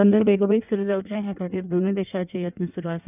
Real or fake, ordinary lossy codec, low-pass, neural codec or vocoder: fake; none; 3.6 kHz; codec, 16 kHz in and 24 kHz out, 1.1 kbps, FireRedTTS-2 codec